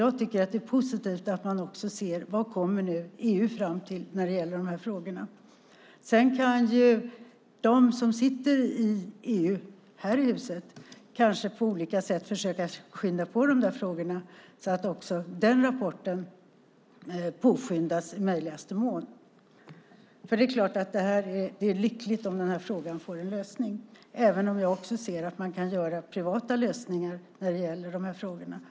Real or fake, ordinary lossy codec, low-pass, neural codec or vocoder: real; none; none; none